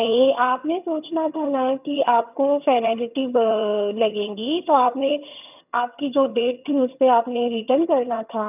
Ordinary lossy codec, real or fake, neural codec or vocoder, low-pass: none; fake; vocoder, 22.05 kHz, 80 mel bands, HiFi-GAN; 3.6 kHz